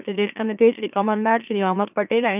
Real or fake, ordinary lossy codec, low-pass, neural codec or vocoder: fake; none; 3.6 kHz; autoencoder, 44.1 kHz, a latent of 192 numbers a frame, MeloTTS